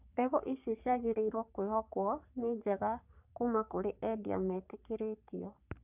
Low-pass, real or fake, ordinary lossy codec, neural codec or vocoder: 3.6 kHz; fake; none; codec, 44.1 kHz, 3.4 kbps, Pupu-Codec